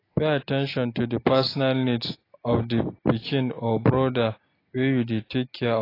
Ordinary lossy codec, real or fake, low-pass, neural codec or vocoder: AAC, 24 kbps; real; 5.4 kHz; none